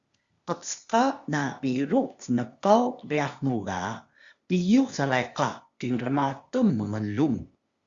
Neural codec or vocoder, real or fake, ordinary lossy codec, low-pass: codec, 16 kHz, 0.8 kbps, ZipCodec; fake; Opus, 64 kbps; 7.2 kHz